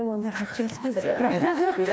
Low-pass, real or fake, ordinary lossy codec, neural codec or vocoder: none; fake; none; codec, 16 kHz, 1 kbps, FreqCodec, larger model